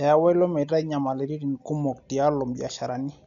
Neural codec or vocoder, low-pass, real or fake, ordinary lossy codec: none; 7.2 kHz; real; none